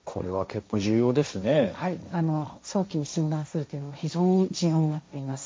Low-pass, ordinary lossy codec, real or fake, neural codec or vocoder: none; none; fake; codec, 16 kHz, 1.1 kbps, Voila-Tokenizer